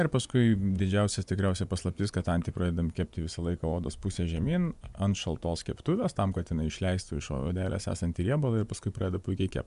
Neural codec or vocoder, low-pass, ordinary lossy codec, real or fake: vocoder, 24 kHz, 100 mel bands, Vocos; 10.8 kHz; MP3, 96 kbps; fake